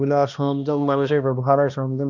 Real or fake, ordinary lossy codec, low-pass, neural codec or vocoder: fake; none; 7.2 kHz; codec, 16 kHz, 1 kbps, X-Codec, HuBERT features, trained on balanced general audio